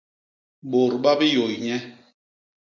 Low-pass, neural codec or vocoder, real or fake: 7.2 kHz; none; real